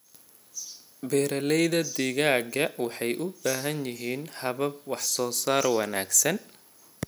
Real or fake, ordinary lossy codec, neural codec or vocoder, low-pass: real; none; none; none